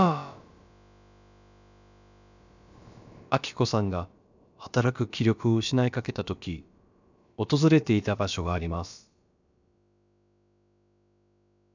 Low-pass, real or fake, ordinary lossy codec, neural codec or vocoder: 7.2 kHz; fake; none; codec, 16 kHz, about 1 kbps, DyCAST, with the encoder's durations